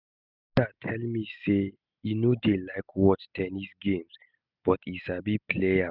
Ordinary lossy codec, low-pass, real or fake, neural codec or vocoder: none; 5.4 kHz; real; none